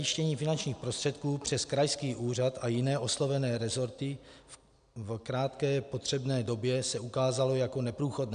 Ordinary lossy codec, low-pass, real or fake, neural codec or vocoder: MP3, 96 kbps; 9.9 kHz; real; none